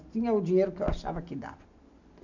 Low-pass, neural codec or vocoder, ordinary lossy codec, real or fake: 7.2 kHz; none; none; real